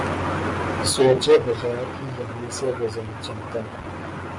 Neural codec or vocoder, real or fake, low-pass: none; real; 10.8 kHz